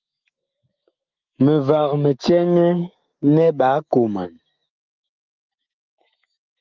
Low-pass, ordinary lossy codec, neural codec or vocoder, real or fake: 7.2 kHz; Opus, 24 kbps; autoencoder, 48 kHz, 128 numbers a frame, DAC-VAE, trained on Japanese speech; fake